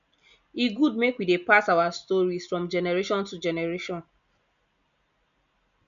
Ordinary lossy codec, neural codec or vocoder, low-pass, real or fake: none; none; 7.2 kHz; real